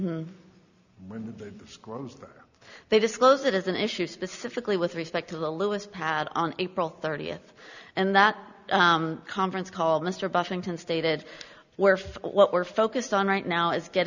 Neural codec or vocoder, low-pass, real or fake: none; 7.2 kHz; real